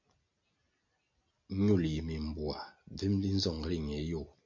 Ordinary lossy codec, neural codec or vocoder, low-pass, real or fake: MP3, 48 kbps; none; 7.2 kHz; real